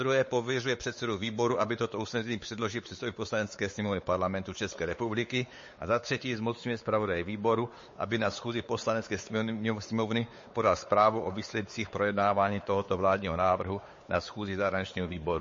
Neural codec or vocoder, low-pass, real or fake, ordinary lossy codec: codec, 16 kHz, 4 kbps, X-Codec, WavLM features, trained on Multilingual LibriSpeech; 7.2 kHz; fake; MP3, 32 kbps